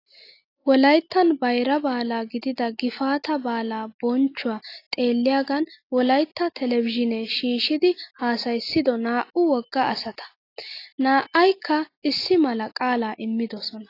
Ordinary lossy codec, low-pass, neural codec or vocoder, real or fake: AAC, 32 kbps; 5.4 kHz; none; real